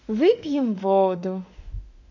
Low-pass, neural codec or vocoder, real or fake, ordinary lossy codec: 7.2 kHz; autoencoder, 48 kHz, 32 numbers a frame, DAC-VAE, trained on Japanese speech; fake; MP3, 64 kbps